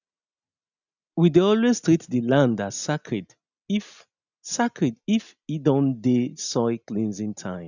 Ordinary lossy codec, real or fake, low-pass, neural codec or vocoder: none; real; 7.2 kHz; none